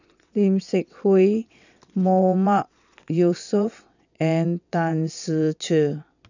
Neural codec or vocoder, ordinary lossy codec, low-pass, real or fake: vocoder, 22.05 kHz, 80 mel bands, WaveNeXt; none; 7.2 kHz; fake